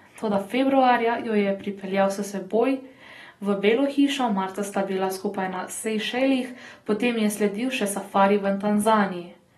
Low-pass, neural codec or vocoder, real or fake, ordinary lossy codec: 19.8 kHz; none; real; AAC, 32 kbps